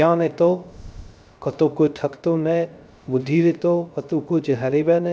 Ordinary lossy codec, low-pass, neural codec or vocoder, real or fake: none; none; codec, 16 kHz, 0.3 kbps, FocalCodec; fake